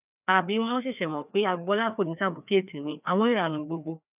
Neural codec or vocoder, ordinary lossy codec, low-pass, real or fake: codec, 16 kHz, 2 kbps, FreqCodec, larger model; none; 3.6 kHz; fake